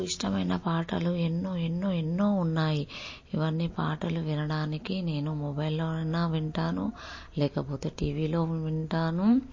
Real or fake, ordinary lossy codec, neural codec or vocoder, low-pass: real; MP3, 32 kbps; none; 7.2 kHz